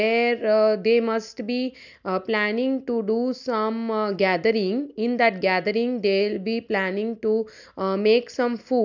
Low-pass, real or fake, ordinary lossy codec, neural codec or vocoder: 7.2 kHz; real; none; none